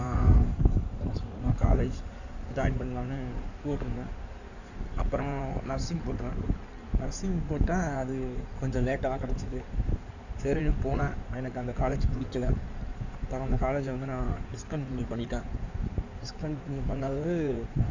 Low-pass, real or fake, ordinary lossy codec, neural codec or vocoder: 7.2 kHz; fake; none; codec, 16 kHz in and 24 kHz out, 2.2 kbps, FireRedTTS-2 codec